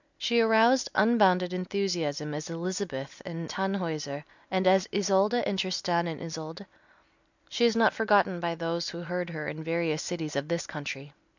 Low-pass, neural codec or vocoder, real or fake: 7.2 kHz; none; real